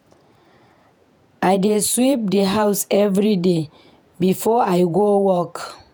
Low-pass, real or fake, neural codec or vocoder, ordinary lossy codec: none; fake; vocoder, 48 kHz, 128 mel bands, Vocos; none